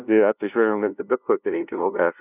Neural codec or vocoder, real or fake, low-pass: codec, 16 kHz, 0.5 kbps, FunCodec, trained on LibriTTS, 25 frames a second; fake; 3.6 kHz